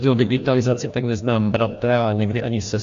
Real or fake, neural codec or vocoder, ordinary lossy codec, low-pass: fake; codec, 16 kHz, 1 kbps, FreqCodec, larger model; AAC, 64 kbps; 7.2 kHz